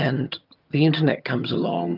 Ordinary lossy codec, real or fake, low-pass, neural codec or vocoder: Opus, 24 kbps; fake; 5.4 kHz; vocoder, 22.05 kHz, 80 mel bands, HiFi-GAN